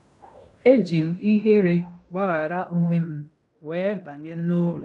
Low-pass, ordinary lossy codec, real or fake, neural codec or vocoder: 10.8 kHz; none; fake; codec, 16 kHz in and 24 kHz out, 0.9 kbps, LongCat-Audio-Codec, fine tuned four codebook decoder